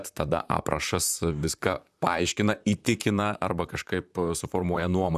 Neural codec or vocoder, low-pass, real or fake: vocoder, 44.1 kHz, 128 mel bands, Pupu-Vocoder; 14.4 kHz; fake